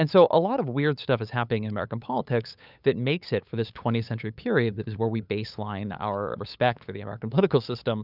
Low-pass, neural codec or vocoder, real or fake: 5.4 kHz; codec, 16 kHz, 8 kbps, FunCodec, trained on LibriTTS, 25 frames a second; fake